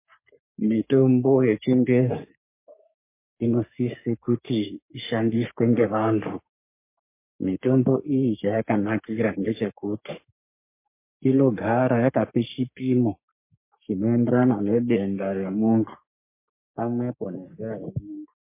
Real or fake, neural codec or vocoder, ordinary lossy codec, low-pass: fake; codec, 44.1 kHz, 3.4 kbps, Pupu-Codec; MP3, 24 kbps; 3.6 kHz